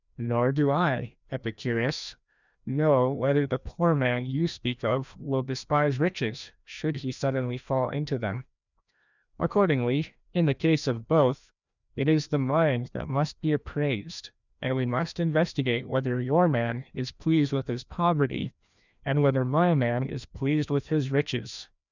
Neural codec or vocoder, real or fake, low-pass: codec, 16 kHz, 1 kbps, FreqCodec, larger model; fake; 7.2 kHz